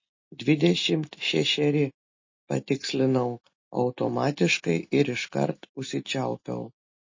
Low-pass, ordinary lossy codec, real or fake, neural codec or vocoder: 7.2 kHz; MP3, 32 kbps; real; none